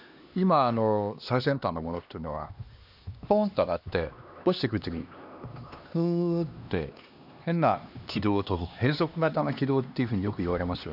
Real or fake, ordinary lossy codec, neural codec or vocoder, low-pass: fake; none; codec, 16 kHz, 2 kbps, X-Codec, HuBERT features, trained on LibriSpeech; 5.4 kHz